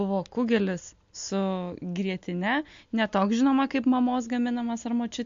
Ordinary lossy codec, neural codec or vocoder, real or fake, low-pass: MP3, 48 kbps; none; real; 7.2 kHz